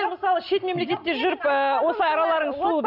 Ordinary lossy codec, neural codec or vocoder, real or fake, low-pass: none; none; real; 5.4 kHz